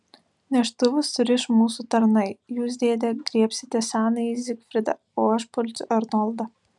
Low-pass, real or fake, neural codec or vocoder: 10.8 kHz; real; none